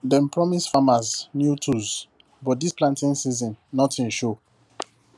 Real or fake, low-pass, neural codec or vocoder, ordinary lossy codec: real; none; none; none